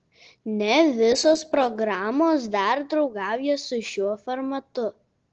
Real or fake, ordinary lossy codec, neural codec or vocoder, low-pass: real; Opus, 16 kbps; none; 7.2 kHz